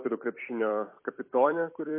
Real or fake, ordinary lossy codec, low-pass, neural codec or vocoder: fake; MP3, 24 kbps; 3.6 kHz; vocoder, 44.1 kHz, 128 mel bands every 256 samples, BigVGAN v2